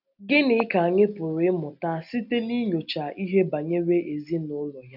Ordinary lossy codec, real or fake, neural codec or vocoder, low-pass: none; real; none; 5.4 kHz